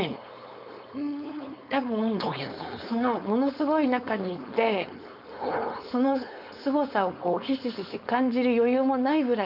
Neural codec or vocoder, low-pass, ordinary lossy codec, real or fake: codec, 16 kHz, 4.8 kbps, FACodec; 5.4 kHz; none; fake